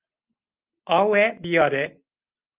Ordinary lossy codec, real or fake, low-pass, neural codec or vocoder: Opus, 32 kbps; real; 3.6 kHz; none